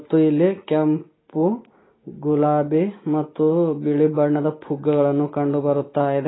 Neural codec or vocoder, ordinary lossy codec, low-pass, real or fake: none; AAC, 16 kbps; 7.2 kHz; real